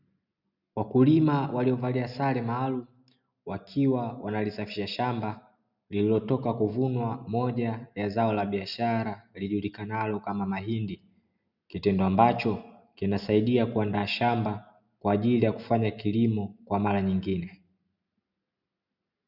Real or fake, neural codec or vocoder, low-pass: real; none; 5.4 kHz